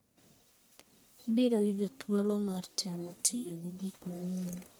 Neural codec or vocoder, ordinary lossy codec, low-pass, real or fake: codec, 44.1 kHz, 1.7 kbps, Pupu-Codec; none; none; fake